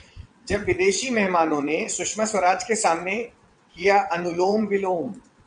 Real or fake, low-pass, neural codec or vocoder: fake; 9.9 kHz; vocoder, 22.05 kHz, 80 mel bands, WaveNeXt